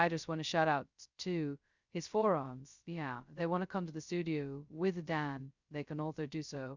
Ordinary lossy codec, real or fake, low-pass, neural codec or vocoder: Opus, 64 kbps; fake; 7.2 kHz; codec, 16 kHz, 0.2 kbps, FocalCodec